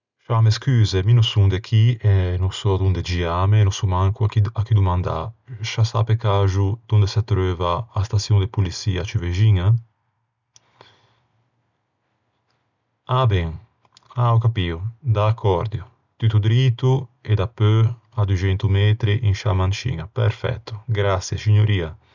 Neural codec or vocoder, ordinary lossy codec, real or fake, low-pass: none; none; real; 7.2 kHz